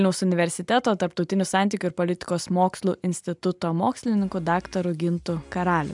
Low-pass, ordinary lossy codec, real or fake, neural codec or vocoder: 10.8 kHz; MP3, 96 kbps; real; none